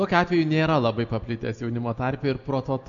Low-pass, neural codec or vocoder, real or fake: 7.2 kHz; none; real